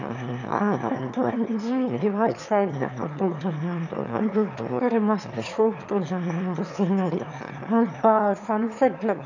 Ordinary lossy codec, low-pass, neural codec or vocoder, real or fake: none; 7.2 kHz; autoencoder, 22.05 kHz, a latent of 192 numbers a frame, VITS, trained on one speaker; fake